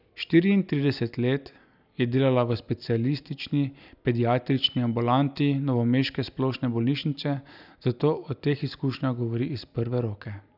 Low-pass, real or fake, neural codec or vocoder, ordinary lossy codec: 5.4 kHz; real; none; none